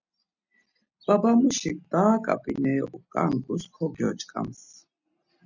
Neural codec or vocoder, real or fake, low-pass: none; real; 7.2 kHz